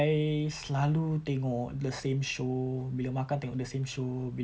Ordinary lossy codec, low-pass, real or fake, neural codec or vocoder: none; none; real; none